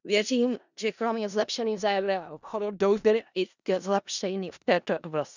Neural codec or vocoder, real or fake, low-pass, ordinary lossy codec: codec, 16 kHz in and 24 kHz out, 0.4 kbps, LongCat-Audio-Codec, four codebook decoder; fake; 7.2 kHz; none